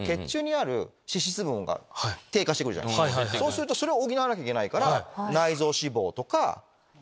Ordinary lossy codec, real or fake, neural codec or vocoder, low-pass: none; real; none; none